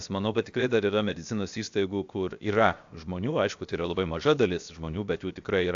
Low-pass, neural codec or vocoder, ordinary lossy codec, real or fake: 7.2 kHz; codec, 16 kHz, 0.7 kbps, FocalCodec; AAC, 64 kbps; fake